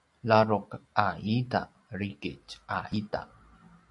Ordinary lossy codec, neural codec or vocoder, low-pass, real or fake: AAC, 64 kbps; none; 10.8 kHz; real